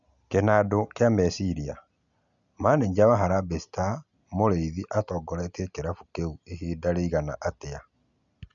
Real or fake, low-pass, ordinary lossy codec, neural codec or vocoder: real; 7.2 kHz; none; none